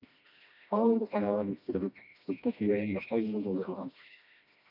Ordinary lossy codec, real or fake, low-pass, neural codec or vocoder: MP3, 48 kbps; fake; 5.4 kHz; codec, 16 kHz, 1 kbps, FreqCodec, smaller model